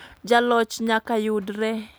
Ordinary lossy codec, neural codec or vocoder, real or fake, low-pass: none; none; real; none